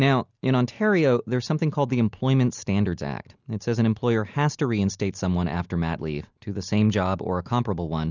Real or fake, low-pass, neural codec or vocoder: real; 7.2 kHz; none